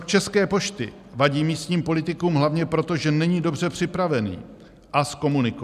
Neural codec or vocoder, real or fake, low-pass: none; real; 14.4 kHz